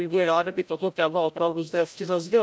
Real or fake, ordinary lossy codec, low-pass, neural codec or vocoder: fake; none; none; codec, 16 kHz, 0.5 kbps, FreqCodec, larger model